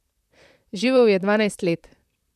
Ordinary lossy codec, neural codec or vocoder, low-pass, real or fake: none; vocoder, 44.1 kHz, 128 mel bands, Pupu-Vocoder; 14.4 kHz; fake